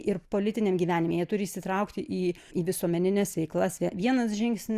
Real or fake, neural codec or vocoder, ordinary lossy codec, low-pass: real; none; Opus, 64 kbps; 14.4 kHz